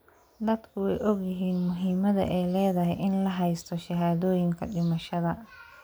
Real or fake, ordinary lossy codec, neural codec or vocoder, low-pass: real; none; none; none